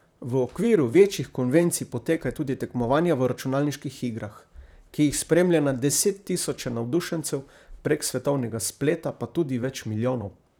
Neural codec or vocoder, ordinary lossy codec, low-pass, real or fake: vocoder, 44.1 kHz, 128 mel bands, Pupu-Vocoder; none; none; fake